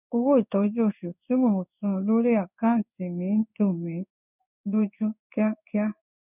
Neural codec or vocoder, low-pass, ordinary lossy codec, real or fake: codec, 16 kHz in and 24 kHz out, 1 kbps, XY-Tokenizer; 3.6 kHz; none; fake